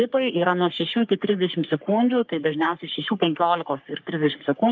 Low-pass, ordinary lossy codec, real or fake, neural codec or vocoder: 7.2 kHz; Opus, 24 kbps; fake; codec, 44.1 kHz, 3.4 kbps, Pupu-Codec